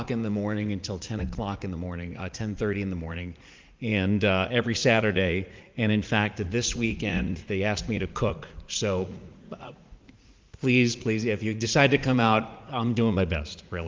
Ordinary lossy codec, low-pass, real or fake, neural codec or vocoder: Opus, 32 kbps; 7.2 kHz; fake; vocoder, 44.1 kHz, 80 mel bands, Vocos